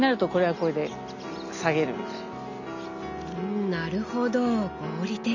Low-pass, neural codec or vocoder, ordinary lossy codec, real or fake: 7.2 kHz; none; none; real